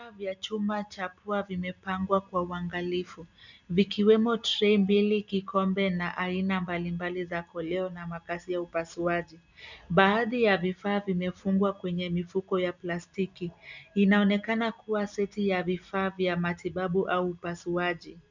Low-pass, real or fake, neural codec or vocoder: 7.2 kHz; real; none